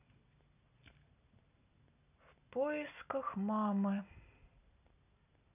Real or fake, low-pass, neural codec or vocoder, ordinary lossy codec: real; 3.6 kHz; none; none